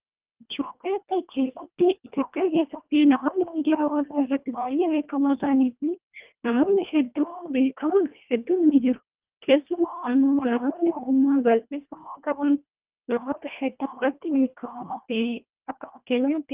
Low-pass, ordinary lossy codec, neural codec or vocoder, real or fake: 3.6 kHz; Opus, 24 kbps; codec, 24 kHz, 1.5 kbps, HILCodec; fake